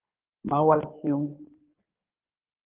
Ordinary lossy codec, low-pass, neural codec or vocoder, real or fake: Opus, 16 kbps; 3.6 kHz; codec, 16 kHz in and 24 kHz out, 2.2 kbps, FireRedTTS-2 codec; fake